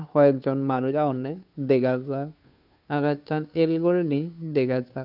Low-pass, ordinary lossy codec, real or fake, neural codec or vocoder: 5.4 kHz; none; fake; codec, 16 kHz, 2 kbps, FunCodec, trained on Chinese and English, 25 frames a second